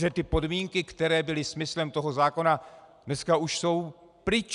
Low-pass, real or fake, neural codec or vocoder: 10.8 kHz; real; none